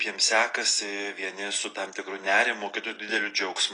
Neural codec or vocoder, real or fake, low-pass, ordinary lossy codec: none; real; 9.9 kHz; AAC, 32 kbps